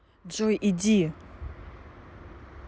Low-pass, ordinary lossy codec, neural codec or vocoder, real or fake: none; none; none; real